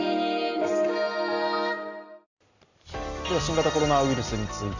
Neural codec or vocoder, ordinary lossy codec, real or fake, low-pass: none; none; real; 7.2 kHz